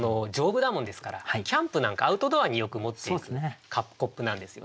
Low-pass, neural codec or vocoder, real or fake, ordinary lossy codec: none; none; real; none